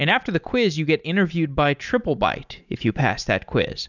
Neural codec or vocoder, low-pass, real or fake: none; 7.2 kHz; real